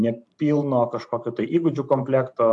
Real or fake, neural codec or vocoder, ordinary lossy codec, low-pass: fake; vocoder, 44.1 kHz, 128 mel bands every 512 samples, BigVGAN v2; AAC, 64 kbps; 10.8 kHz